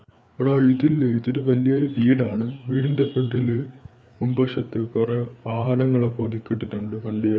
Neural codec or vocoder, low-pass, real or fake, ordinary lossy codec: codec, 16 kHz, 4 kbps, FreqCodec, larger model; none; fake; none